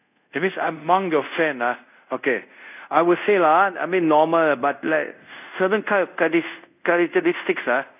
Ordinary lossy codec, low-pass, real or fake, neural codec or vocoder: none; 3.6 kHz; fake; codec, 24 kHz, 0.5 kbps, DualCodec